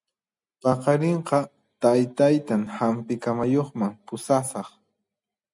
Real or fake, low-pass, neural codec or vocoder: real; 10.8 kHz; none